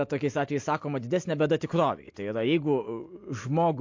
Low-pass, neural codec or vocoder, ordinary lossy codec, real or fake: 7.2 kHz; none; MP3, 48 kbps; real